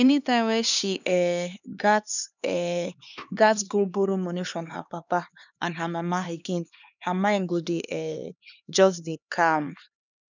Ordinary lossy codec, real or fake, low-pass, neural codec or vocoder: none; fake; 7.2 kHz; codec, 16 kHz, 2 kbps, X-Codec, HuBERT features, trained on LibriSpeech